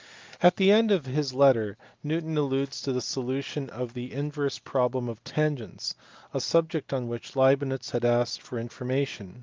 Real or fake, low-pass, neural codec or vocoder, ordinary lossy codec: real; 7.2 kHz; none; Opus, 32 kbps